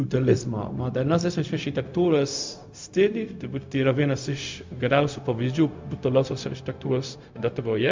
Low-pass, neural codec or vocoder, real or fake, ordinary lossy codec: 7.2 kHz; codec, 16 kHz, 0.4 kbps, LongCat-Audio-Codec; fake; MP3, 64 kbps